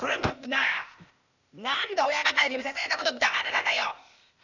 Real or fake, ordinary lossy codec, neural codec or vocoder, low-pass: fake; none; codec, 16 kHz, 0.8 kbps, ZipCodec; 7.2 kHz